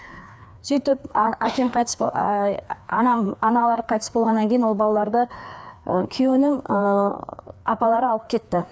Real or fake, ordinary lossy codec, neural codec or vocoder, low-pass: fake; none; codec, 16 kHz, 2 kbps, FreqCodec, larger model; none